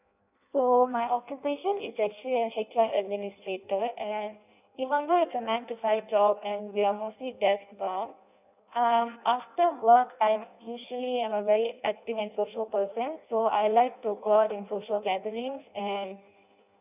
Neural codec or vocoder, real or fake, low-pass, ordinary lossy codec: codec, 16 kHz in and 24 kHz out, 0.6 kbps, FireRedTTS-2 codec; fake; 3.6 kHz; none